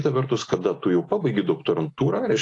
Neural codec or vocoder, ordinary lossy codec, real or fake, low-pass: none; AAC, 48 kbps; real; 10.8 kHz